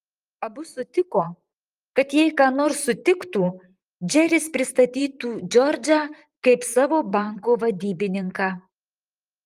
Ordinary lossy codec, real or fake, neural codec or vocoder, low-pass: Opus, 32 kbps; fake; vocoder, 44.1 kHz, 128 mel bands, Pupu-Vocoder; 14.4 kHz